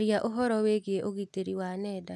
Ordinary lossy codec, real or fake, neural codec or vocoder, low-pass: none; real; none; none